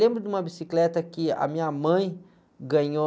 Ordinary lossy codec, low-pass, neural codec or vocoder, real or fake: none; none; none; real